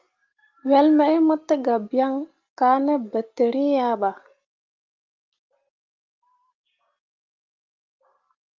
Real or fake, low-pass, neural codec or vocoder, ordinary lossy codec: real; 7.2 kHz; none; Opus, 24 kbps